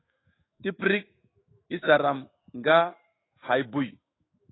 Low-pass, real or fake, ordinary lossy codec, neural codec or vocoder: 7.2 kHz; fake; AAC, 16 kbps; autoencoder, 48 kHz, 128 numbers a frame, DAC-VAE, trained on Japanese speech